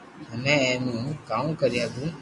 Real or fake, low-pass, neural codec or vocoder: real; 10.8 kHz; none